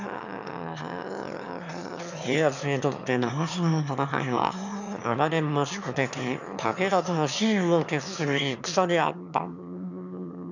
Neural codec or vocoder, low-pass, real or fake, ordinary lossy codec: autoencoder, 22.05 kHz, a latent of 192 numbers a frame, VITS, trained on one speaker; 7.2 kHz; fake; none